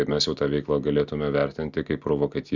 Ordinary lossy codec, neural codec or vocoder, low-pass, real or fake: Opus, 64 kbps; none; 7.2 kHz; real